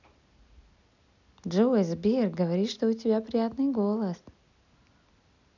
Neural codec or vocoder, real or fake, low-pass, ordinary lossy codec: none; real; 7.2 kHz; none